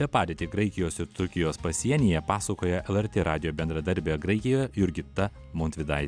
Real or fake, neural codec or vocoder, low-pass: real; none; 9.9 kHz